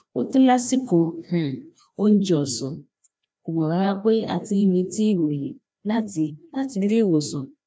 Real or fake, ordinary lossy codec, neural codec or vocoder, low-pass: fake; none; codec, 16 kHz, 1 kbps, FreqCodec, larger model; none